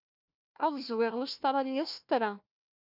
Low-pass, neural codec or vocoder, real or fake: 5.4 kHz; codec, 16 kHz, 1 kbps, FunCodec, trained on Chinese and English, 50 frames a second; fake